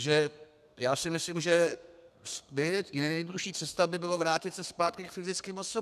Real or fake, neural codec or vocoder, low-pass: fake; codec, 32 kHz, 1.9 kbps, SNAC; 14.4 kHz